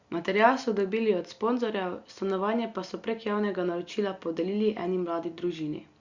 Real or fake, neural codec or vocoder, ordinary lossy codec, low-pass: real; none; Opus, 64 kbps; 7.2 kHz